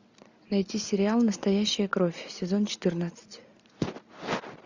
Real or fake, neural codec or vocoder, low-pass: real; none; 7.2 kHz